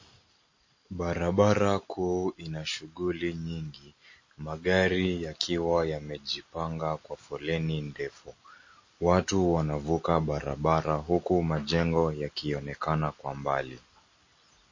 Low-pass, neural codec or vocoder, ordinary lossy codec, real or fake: 7.2 kHz; none; MP3, 32 kbps; real